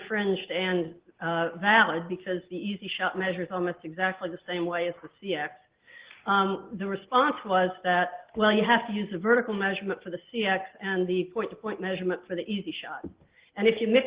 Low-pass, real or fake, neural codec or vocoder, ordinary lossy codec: 3.6 kHz; real; none; Opus, 16 kbps